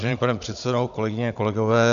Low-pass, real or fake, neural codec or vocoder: 7.2 kHz; fake; codec, 16 kHz, 16 kbps, FunCodec, trained on Chinese and English, 50 frames a second